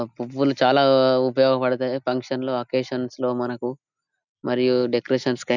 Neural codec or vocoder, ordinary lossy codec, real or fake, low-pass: none; none; real; 7.2 kHz